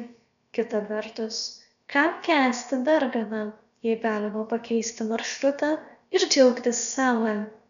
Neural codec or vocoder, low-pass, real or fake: codec, 16 kHz, about 1 kbps, DyCAST, with the encoder's durations; 7.2 kHz; fake